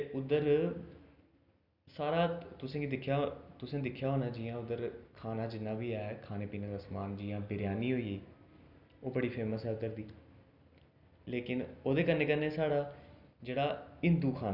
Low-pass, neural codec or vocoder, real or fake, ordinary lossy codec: 5.4 kHz; none; real; none